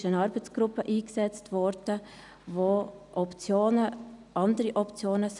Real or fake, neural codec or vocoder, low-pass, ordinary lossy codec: real; none; 10.8 kHz; none